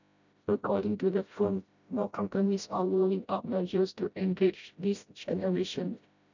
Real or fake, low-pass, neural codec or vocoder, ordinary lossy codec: fake; 7.2 kHz; codec, 16 kHz, 0.5 kbps, FreqCodec, smaller model; none